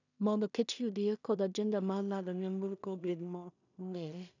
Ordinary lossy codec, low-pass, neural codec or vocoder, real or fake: none; 7.2 kHz; codec, 16 kHz in and 24 kHz out, 0.4 kbps, LongCat-Audio-Codec, two codebook decoder; fake